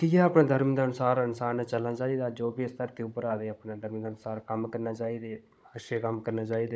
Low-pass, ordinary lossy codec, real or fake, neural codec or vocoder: none; none; fake; codec, 16 kHz, 16 kbps, FunCodec, trained on Chinese and English, 50 frames a second